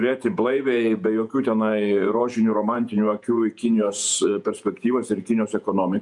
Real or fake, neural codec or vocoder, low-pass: fake; vocoder, 48 kHz, 128 mel bands, Vocos; 10.8 kHz